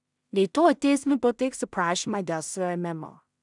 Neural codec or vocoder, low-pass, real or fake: codec, 16 kHz in and 24 kHz out, 0.4 kbps, LongCat-Audio-Codec, two codebook decoder; 10.8 kHz; fake